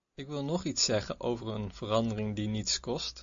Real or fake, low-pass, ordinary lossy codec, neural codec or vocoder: real; 7.2 kHz; MP3, 48 kbps; none